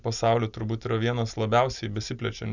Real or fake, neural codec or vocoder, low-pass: real; none; 7.2 kHz